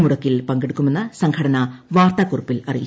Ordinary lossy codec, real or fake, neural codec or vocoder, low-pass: none; real; none; none